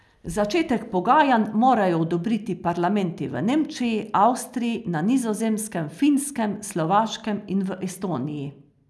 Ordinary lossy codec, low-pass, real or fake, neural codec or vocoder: none; none; real; none